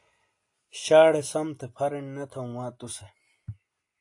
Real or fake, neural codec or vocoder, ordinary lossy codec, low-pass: real; none; AAC, 48 kbps; 10.8 kHz